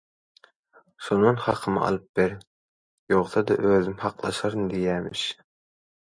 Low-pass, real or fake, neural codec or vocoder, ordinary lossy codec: 9.9 kHz; real; none; MP3, 48 kbps